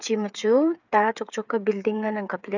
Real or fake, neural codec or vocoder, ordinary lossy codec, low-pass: fake; codec, 16 kHz, 8 kbps, FreqCodec, smaller model; none; 7.2 kHz